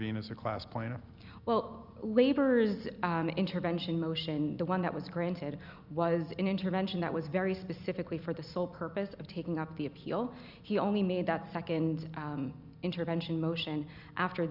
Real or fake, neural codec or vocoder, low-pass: real; none; 5.4 kHz